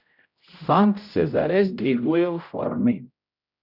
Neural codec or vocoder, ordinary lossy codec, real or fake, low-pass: codec, 16 kHz, 0.5 kbps, X-Codec, HuBERT features, trained on general audio; none; fake; 5.4 kHz